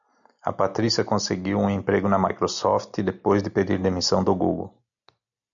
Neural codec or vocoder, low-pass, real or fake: none; 7.2 kHz; real